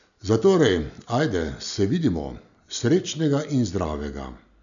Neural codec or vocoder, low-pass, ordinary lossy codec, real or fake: none; 7.2 kHz; none; real